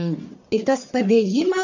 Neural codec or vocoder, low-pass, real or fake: codec, 44.1 kHz, 1.7 kbps, Pupu-Codec; 7.2 kHz; fake